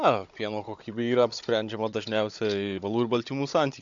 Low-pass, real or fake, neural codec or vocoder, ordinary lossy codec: 7.2 kHz; fake; codec, 16 kHz, 16 kbps, FunCodec, trained on Chinese and English, 50 frames a second; AAC, 64 kbps